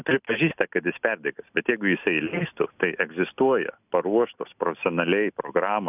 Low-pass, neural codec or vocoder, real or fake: 3.6 kHz; none; real